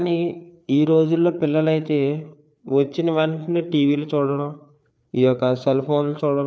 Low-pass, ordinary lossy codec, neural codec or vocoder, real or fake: none; none; codec, 16 kHz, 4 kbps, FreqCodec, larger model; fake